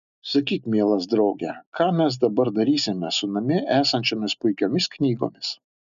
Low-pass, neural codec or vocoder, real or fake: 7.2 kHz; none; real